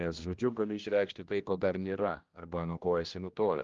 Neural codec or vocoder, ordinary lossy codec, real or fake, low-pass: codec, 16 kHz, 1 kbps, X-Codec, HuBERT features, trained on general audio; Opus, 32 kbps; fake; 7.2 kHz